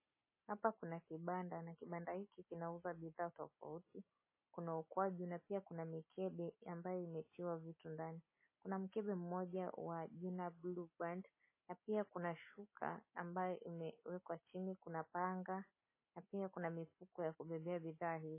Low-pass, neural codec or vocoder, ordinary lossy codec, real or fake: 3.6 kHz; none; MP3, 16 kbps; real